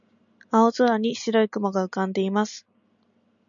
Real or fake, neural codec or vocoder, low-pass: real; none; 7.2 kHz